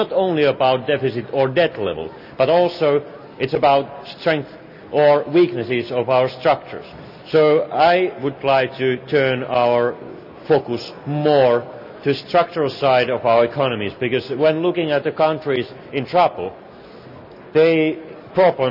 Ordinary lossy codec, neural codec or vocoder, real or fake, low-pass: none; none; real; 5.4 kHz